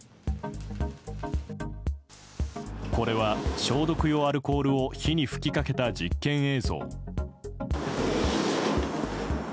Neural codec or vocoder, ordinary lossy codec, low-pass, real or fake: none; none; none; real